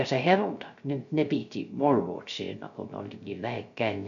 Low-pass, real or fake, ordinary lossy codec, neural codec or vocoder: 7.2 kHz; fake; none; codec, 16 kHz, 0.3 kbps, FocalCodec